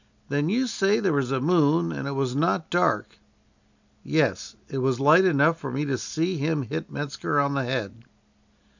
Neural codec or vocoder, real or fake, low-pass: none; real; 7.2 kHz